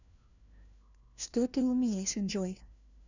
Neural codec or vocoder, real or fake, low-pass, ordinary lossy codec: codec, 16 kHz, 1 kbps, FunCodec, trained on LibriTTS, 50 frames a second; fake; 7.2 kHz; none